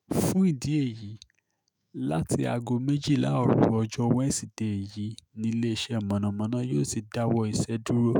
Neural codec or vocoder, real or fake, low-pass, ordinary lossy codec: autoencoder, 48 kHz, 128 numbers a frame, DAC-VAE, trained on Japanese speech; fake; none; none